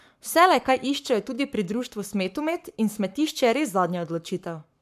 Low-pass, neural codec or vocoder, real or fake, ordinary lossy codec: 14.4 kHz; codec, 44.1 kHz, 7.8 kbps, Pupu-Codec; fake; MP3, 96 kbps